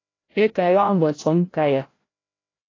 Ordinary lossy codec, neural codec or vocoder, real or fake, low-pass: AAC, 32 kbps; codec, 16 kHz, 0.5 kbps, FreqCodec, larger model; fake; 7.2 kHz